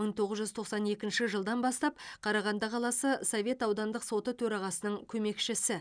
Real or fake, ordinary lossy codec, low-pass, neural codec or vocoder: real; none; 9.9 kHz; none